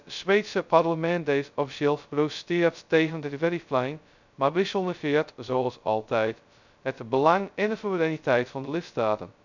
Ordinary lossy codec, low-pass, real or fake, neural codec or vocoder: none; 7.2 kHz; fake; codec, 16 kHz, 0.2 kbps, FocalCodec